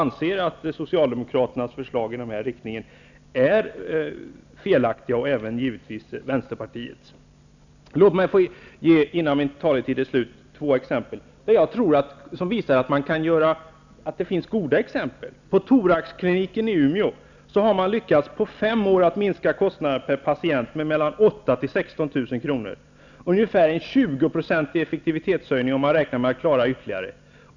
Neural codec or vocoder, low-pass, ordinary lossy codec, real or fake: none; 7.2 kHz; none; real